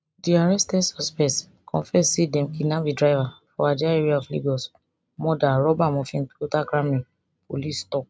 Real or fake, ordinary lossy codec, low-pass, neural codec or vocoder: real; none; none; none